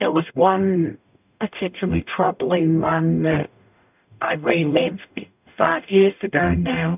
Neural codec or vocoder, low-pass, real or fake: codec, 44.1 kHz, 0.9 kbps, DAC; 3.6 kHz; fake